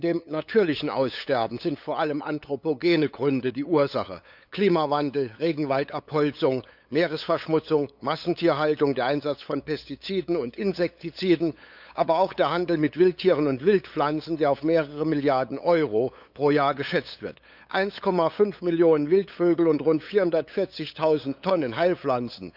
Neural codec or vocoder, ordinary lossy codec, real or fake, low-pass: codec, 16 kHz, 8 kbps, FunCodec, trained on LibriTTS, 25 frames a second; none; fake; 5.4 kHz